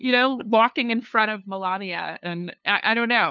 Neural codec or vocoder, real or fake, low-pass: codec, 16 kHz, 2 kbps, FunCodec, trained on LibriTTS, 25 frames a second; fake; 7.2 kHz